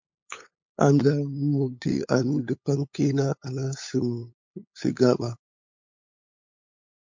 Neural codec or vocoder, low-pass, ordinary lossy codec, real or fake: codec, 16 kHz, 8 kbps, FunCodec, trained on LibriTTS, 25 frames a second; 7.2 kHz; MP3, 48 kbps; fake